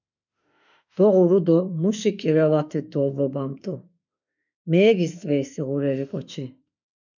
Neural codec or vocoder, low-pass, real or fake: autoencoder, 48 kHz, 32 numbers a frame, DAC-VAE, trained on Japanese speech; 7.2 kHz; fake